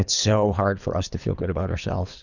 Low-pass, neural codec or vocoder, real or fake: 7.2 kHz; codec, 24 kHz, 3 kbps, HILCodec; fake